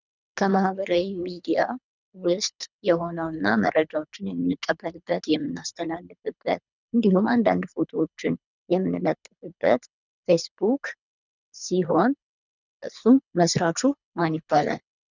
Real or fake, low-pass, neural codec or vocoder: fake; 7.2 kHz; codec, 24 kHz, 3 kbps, HILCodec